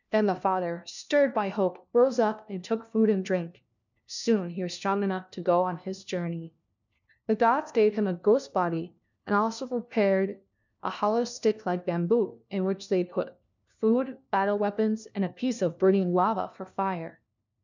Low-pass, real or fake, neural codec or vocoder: 7.2 kHz; fake; codec, 16 kHz, 1 kbps, FunCodec, trained on LibriTTS, 50 frames a second